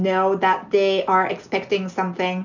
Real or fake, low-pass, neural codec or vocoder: real; 7.2 kHz; none